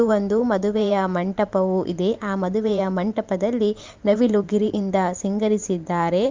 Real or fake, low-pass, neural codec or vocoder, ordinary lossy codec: fake; 7.2 kHz; vocoder, 44.1 kHz, 128 mel bands every 512 samples, BigVGAN v2; Opus, 24 kbps